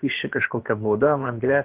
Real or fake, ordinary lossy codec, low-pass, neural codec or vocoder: fake; Opus, 16 kbps; 3.6 kHz; codec, 16 kHz, about 1 kbps, DyCAST, with the encoder's durations